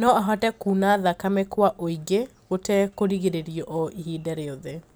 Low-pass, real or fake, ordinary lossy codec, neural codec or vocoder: none; real; none; none